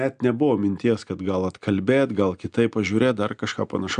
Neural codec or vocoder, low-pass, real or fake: none; 9.9 kHz; real